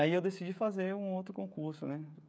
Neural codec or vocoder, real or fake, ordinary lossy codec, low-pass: codec, 16 kHz, 16 kbps, FreqCodec, smaller model; fake; none; none